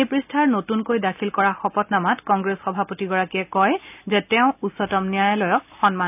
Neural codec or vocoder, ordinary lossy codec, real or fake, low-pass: none; none; real; 3.6 kHz